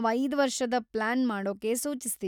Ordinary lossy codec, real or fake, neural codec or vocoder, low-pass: none; real; none; 19.8 kHz